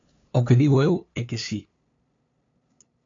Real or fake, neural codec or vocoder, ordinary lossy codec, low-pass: fake; codec, 16 kHz, 2 kbps, FunCodec, trained on LibriTTS, 25 frames a second; AAC, 64 kbps; 7.2 kHz